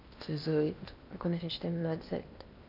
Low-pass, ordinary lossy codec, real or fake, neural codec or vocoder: 5.4 kHz; none; fake; codec, 16 kHz in and 24 kHz out, 0.8 kbps, FocalCodec, streaming, 65536 codes